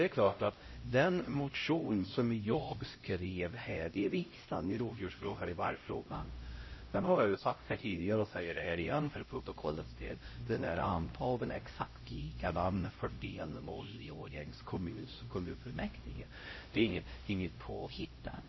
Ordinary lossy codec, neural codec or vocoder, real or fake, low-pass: MP3, 24 kbps; codec, 16 kHz, 0.5 kbps, X-Codec, HuBERT features, trained on LibriSpeech; fake; 7.2 kHz